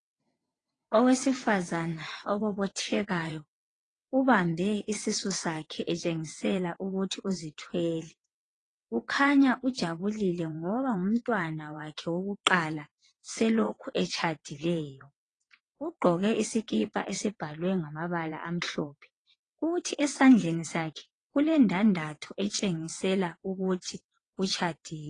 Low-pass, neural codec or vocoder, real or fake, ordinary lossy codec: 9.9 kHz; vocoder, 22.05 kHz, 80 mel bands, WaveNeXt; fake; AAC, 32 kbps